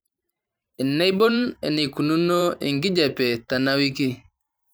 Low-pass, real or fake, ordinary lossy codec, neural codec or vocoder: none; fake; none; vocoder, 44.1 kHz, 128 mel bands every 512 samples, BigVGAN v2